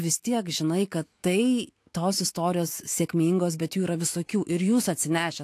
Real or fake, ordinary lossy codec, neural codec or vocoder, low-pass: fake; AAC, 64 kbps; autoencoder, 48 kHz, 128 numbers a frame, DAC-VAE, trained on Japanese speech; 14.4 kHz